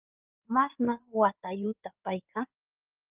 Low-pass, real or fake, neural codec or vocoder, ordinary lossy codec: 3.6 kHz; fake; codec, 16 kHz in and 24 kHz out, 2.2 kbps, FireRedTTS-2 codec; Opus, 32 kbps